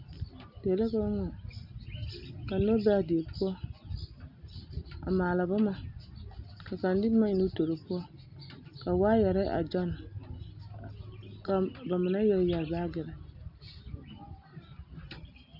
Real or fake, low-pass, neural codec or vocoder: real; 5.4 kHz; none